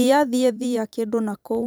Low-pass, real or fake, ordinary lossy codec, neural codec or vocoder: none; fake; none; vocoder, 44.1 kHz, 128 mel bands every 512 samples, BigVGAN v2